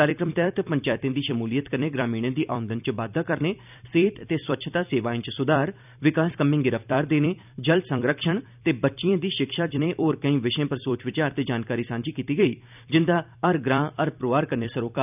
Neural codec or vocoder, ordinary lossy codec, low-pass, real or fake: vocoder, 44.1 kHz, 128 mel bands every 256 samples, BigVGAN v2; none; 3.6 kHz; fake